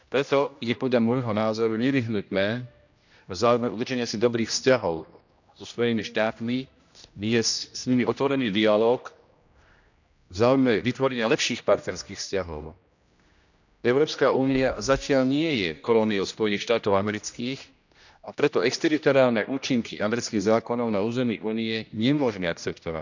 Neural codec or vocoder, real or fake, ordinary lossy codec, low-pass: codec, 16 kHz, 1 kbps, X-Codec, HuBERT features, trained on general audio; fake; none; 7.2 kHz